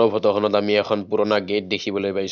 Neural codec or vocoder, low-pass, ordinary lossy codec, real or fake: none; 7.2 kHz; none; real